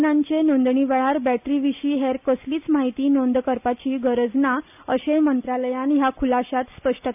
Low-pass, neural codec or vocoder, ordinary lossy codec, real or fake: 3.6 kHz; none; none; real